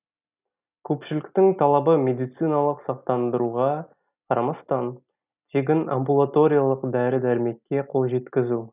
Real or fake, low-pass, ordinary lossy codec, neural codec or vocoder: real; 3.6 kHz; none; none